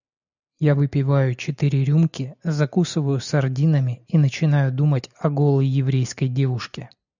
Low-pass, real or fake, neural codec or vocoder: 7.2 kHz; real; none